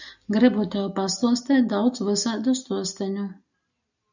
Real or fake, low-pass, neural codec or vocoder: real; 7.2 kHz; none